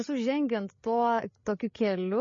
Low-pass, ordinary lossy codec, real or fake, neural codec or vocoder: 7.2 kHz; MP3, 32 kbps; fake; codec, 16 kHz, 8 kbps, FreqCodec, larger model